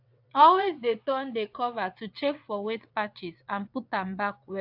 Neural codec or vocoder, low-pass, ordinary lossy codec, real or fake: vocoder, 22.05 kHz, 80 mel bands, WaveNeXt; 5.4 kHz; AAC, 48 kbps; fake